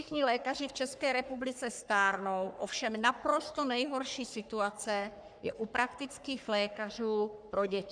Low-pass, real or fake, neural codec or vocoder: 9.9 kHz; fake; codec, 44.1 kHz, 3.4 kbps, Pupu-Codec